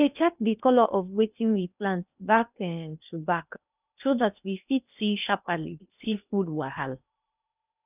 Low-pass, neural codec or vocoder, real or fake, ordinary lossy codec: 3.6 kHz; codec, 16 kHz in and 24 kHz out, 0.8 kbps, FocalCodec, streaming, 65536 codes; fake; none